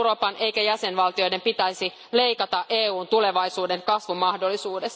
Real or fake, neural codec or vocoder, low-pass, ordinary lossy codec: real; none; none; none